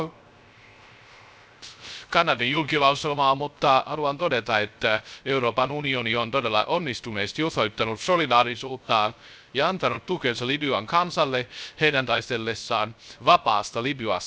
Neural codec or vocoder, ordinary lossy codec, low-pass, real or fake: codec, 16 kHz, 0.3 kbps, FocalCodec; none; none; fake